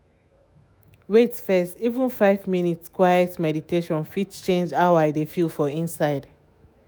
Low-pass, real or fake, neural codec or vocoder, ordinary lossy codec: none; fake; autoencoder, 48 kHz, 128 numbers a frame, DAC-VAE, trained on Japanese speech; none